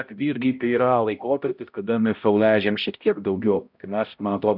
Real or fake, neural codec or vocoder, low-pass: fake; codec, 16 kHz, 0.5 kbps, X-Codec, HuBERT features, trained on balanced general audio; 5.4 kHz